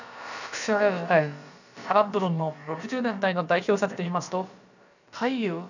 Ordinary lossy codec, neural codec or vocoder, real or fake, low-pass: none; codec, 16 kHz, about 1 kbps, DyCAST, with the encoder's durations; fake; 7.2 kHz